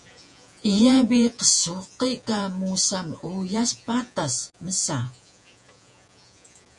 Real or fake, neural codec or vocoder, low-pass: fake; vocoder, 48 kHz, 128 mel bands, Vocos; 10.8 kHz